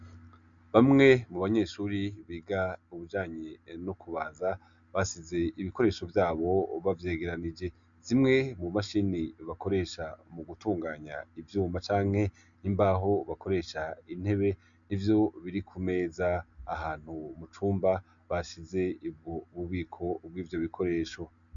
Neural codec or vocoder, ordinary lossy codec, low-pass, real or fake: none; MP3, 96 kbps; 7.2 kHz; real